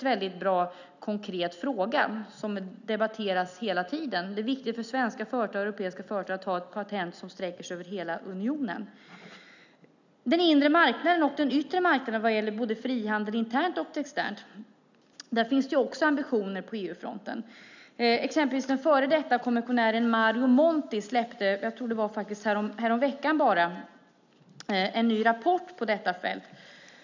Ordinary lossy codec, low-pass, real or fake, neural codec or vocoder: none; 7.2 kHz; real; none